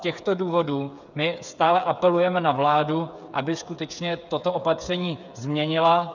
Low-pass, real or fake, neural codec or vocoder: 7.2 kHz; fake; codec, 16 kHz, 8 kbps, FreqCodec, smaller model